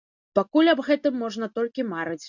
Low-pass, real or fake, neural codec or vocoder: 7.2 kHz; real; none